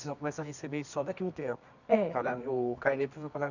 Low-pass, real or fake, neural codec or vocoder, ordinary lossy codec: 7.2 kHz; fake; codec, 24 kHz, 0.9 kbps, WavTokenizer, medium music audio release; none